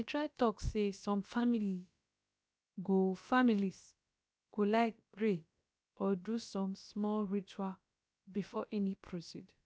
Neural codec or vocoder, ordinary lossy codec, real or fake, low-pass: codec, 16 kHz, about 1 kbps, DyCAST, with the encoder's durations; none; fake; none